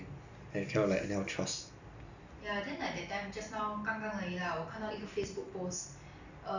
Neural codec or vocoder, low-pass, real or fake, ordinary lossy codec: none; 7.2 kHz; real; none